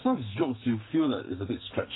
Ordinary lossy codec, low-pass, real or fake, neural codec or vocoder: AAC, 16 kbps; 7.2 kHz; fake; codec, 44.1 kHz, 2.6 kbps, SNAC